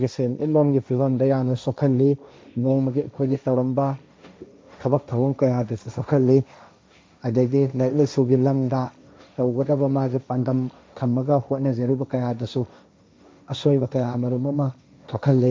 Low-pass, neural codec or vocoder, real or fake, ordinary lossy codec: none; codec, 16 kHz, 1.1 kbps, Voila-Tokenizer; fake; none